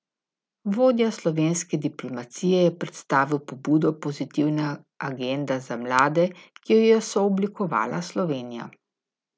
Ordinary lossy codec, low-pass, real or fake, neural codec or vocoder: none; none; real; none